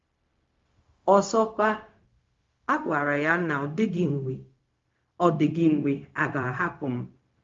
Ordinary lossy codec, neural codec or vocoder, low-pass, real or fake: Opus, 32 kbps; codec, 16 kHz, 0.4 kbps, LongCat-Audio-Codec; 7.2 kHz; fake